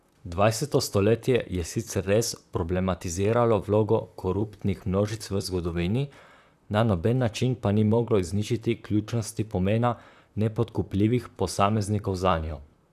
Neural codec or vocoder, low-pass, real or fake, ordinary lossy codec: vocoder, 44.1 kHz, 128 mel bands, Pupu-Vocoder; 14.4 kHz; fake; none